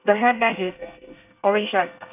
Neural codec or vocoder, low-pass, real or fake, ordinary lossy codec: codec, 24 kHz, 1 kbps, SNAC; 3.6 kHz; fake; none